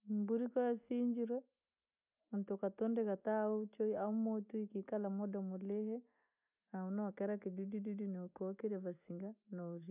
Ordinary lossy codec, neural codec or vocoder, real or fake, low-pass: none; none; real; 3.6 kHz